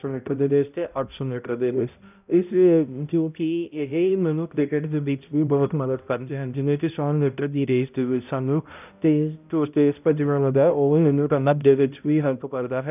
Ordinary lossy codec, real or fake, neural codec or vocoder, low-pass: none; fake; codec, 16 kHz, 0.5 kbps, X-Codec, HuBERT features, trained on balanced general audio; 3.6 kHz